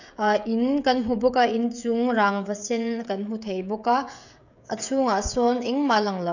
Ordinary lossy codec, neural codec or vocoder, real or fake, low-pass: none; codec, 16 kHz, 16 kbps, FreqCodec, smaller model; fake; 7.2 kHz